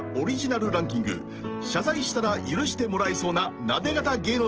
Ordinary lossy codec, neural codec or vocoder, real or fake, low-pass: Opus, 16 kbps; none; real; 7.2 kHz